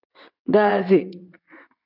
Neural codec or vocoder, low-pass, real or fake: vocoder, 22.05 kHz, 80 mel bands, WaveNeXt; 5.4 kHz; fake